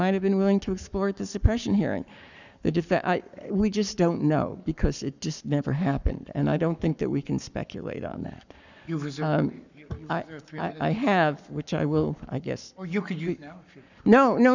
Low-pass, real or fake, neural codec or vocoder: 7.2 kHz; fake; codec, 44.1 kHz, 7.8 kbps, Pupu-Codec